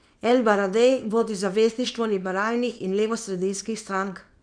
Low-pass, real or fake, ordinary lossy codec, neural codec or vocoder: 9.9 kHz; fake; none; codec, 24 kHz, 0.9 kbps, WavTokenizer, small release